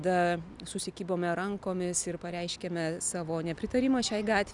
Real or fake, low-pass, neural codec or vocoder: real; 10.8 kHz; none